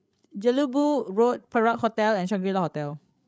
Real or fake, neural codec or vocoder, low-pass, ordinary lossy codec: fake; codec, 16 kHz, 16 kbps, FreqCodec, larger model; none; none